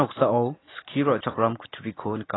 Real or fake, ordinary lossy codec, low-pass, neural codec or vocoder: real; AAC, 16 kbps; 7.2 kHz; none